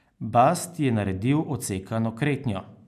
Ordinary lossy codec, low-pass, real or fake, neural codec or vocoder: none; 14.4 kHz; real; none